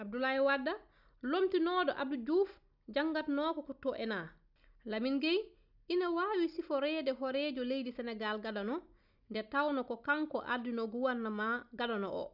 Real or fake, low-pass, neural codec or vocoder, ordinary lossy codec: real; 5.4 kHz; none; none